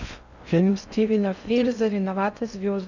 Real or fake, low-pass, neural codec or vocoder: fake; 7.2 kHz; codec, 16 kHz in and 24 kHz out, 0.6 kbps, FocalCodec, streaming, 4096 codes